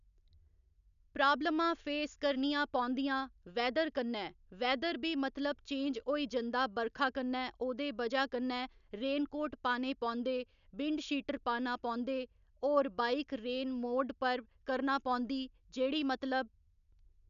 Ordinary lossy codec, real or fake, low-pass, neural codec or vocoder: none; real; 7.2 kHz; none